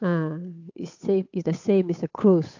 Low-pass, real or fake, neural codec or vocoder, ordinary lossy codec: 7.2 kHz; fake; codec, 16 kHz, 8 kbps, FunCodec, trained on Chinese and English, 25 frames a second; AAC, 48 kbps